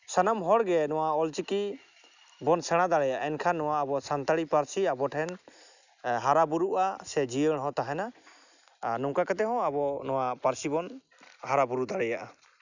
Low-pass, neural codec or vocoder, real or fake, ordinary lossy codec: 7.2 kHz; none; real; none